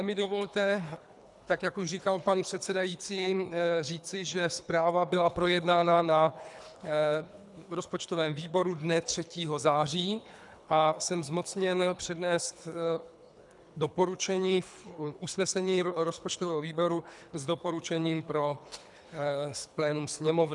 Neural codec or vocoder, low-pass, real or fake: codec, 24 kHz, 3 kbps, HILCodec; 10.8 kHz; fake